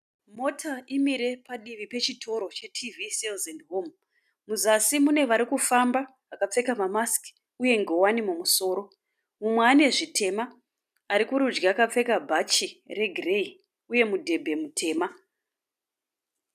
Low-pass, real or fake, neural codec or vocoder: 14.4 kHz; real; none